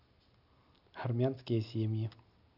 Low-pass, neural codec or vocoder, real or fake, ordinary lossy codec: 5.4 kHz; none; real; none